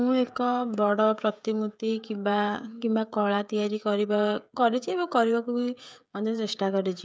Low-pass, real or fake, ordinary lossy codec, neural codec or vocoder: none; fake; none; codec, 16 kHz, 16 kbps, FreqCodec, smaller model